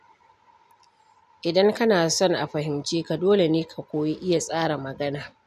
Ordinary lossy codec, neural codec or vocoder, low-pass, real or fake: none; none; 14.4 kHz; real